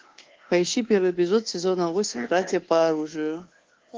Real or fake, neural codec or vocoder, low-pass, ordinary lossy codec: fake; codec, 24 kHz, 1.2 kbps, DualCodec; 7.2 kHz; Opus, 16 kbps